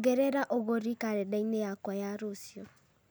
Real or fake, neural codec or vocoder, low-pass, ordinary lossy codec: real; none; none; none